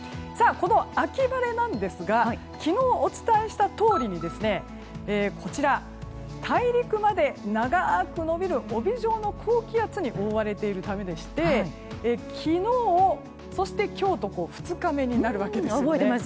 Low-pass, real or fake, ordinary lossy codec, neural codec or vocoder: none; real; none; none